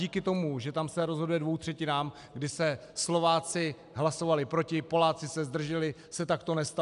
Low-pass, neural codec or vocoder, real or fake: 10.8 kHz; none; real